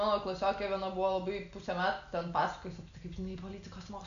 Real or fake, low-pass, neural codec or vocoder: real; 7.2 kHz; none